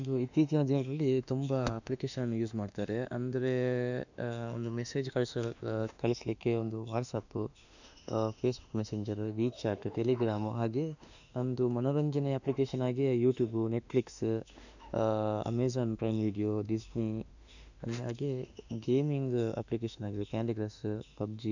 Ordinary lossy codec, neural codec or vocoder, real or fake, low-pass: none; autoencoder, 48 kHz, 32 numbers a frame, DAC-VAE, trained on Japanese speech; fake; 7.2 kHz